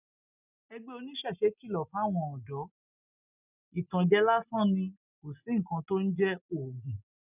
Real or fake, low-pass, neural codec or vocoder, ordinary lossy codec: real; 3.6 kHz; none; none